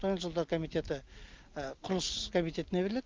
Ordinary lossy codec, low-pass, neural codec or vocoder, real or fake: Opus, 24 kbps; 7.2 kHz; none; real